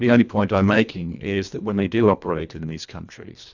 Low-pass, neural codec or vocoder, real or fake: 7.2 kHz; codec, 24 kHz, 1.5 kbps, HILCodec; fake